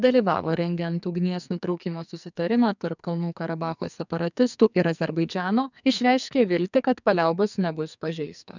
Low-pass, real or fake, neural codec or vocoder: 7.2 kHz; fake; codec, 44.1 kHz, 2.6 kbps, SNAC